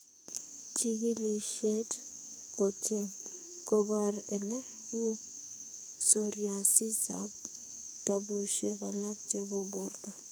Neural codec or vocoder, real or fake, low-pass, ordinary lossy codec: codec, 44.1 kHz, 2.6 kbps, SNAC; fake; none; none